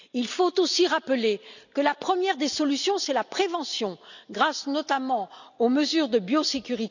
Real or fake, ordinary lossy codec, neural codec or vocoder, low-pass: real; none; none; 7.2 kHz